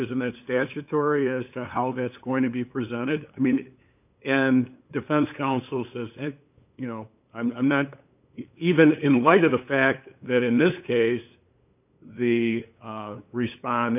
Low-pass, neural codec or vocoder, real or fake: 3.6 kHz; codec, 16 kHz, 8 kbps, FunCodec, trained on LibriTTS, 25 frames a second; fake